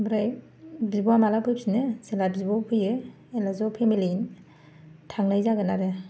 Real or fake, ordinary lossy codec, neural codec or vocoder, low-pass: real; none; none; none